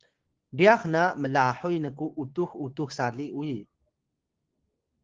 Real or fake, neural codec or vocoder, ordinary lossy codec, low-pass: fake; codec, 16 kHz, 6 kbps, DAC; Opus, 16 kbps; 7.2 kHz